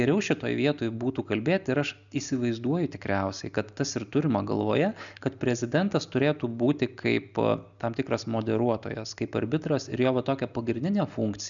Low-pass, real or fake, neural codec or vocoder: 7.2 kHz; real; none